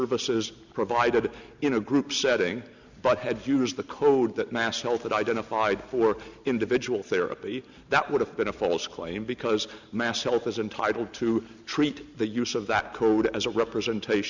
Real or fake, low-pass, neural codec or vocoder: real; 7.2 kHz; none